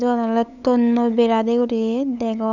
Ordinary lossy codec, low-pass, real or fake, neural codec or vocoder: none; 7.2 kHz; fake; codec, 16 kHz, 8 kbps, FunCodec, trained on LibriTTS, 25 frames a second